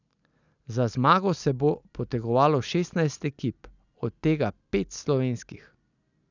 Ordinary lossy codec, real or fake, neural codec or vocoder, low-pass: none; real; none; 7.2 kHz